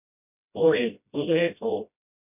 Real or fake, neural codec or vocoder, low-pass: fake; codec, 16 kHz, 0.5 kbps, FreqCodec, smaller model; 3.6 kHz